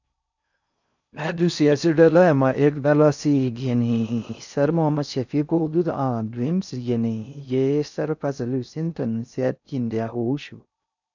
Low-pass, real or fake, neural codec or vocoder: 7.2 kHz; fake; codec, 16 kHz in and 24 kHz out, 0.6 kbps, FocalCodec, streaming, 4096 codes